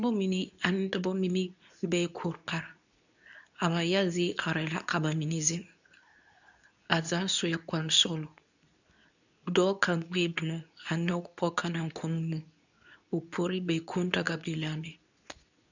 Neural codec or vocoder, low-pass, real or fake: codec, 24 kHz, 0.9 kbps, WavTokenizer, medium speech release version 2; 7.2 kHz; fake